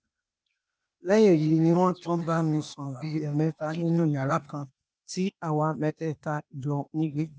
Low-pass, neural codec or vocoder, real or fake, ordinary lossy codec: none; codec, 16 kHz, 0.8 kbps, ZipCodec; fake; none